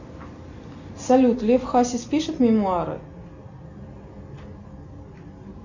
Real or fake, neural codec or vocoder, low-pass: real; none; 7.2 kHz